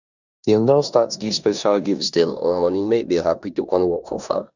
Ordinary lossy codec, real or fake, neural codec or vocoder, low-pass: none; fake; codec, 16 kHz in and 24 kHz out, 0.9 kbps, LongCat-Audio-Codec, four codebook decoder; 7.2 kHz